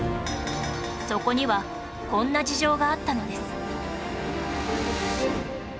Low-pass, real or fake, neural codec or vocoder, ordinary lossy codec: none; real; none; none